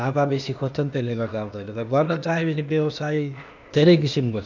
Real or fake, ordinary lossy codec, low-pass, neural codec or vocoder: fake; none; 7.2 kHz; codec, 16 kHz, 0.8 kbps, ZipCodec